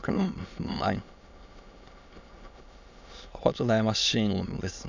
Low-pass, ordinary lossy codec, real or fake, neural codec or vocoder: 7.2 kHz; none; fake; autoencoder, 22.05 kHz, a latent of 192 numbers a frame, VITS, trained on many speakers